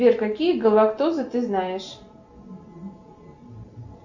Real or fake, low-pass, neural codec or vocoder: real; 7.2 kHz; none